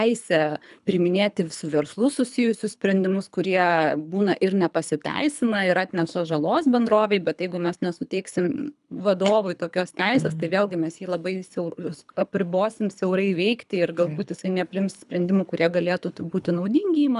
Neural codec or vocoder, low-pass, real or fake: codec, 24 kHz, 3 kbps, HILCodec; 10.8 kHz; fake